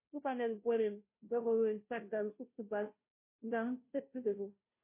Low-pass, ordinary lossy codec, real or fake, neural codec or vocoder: 3.6 kHz; MP3, 24 kbps; fake; codec, 16 kHz, 0.5 kbps, FunCodec, trained on Chinese and English, 25 frames a second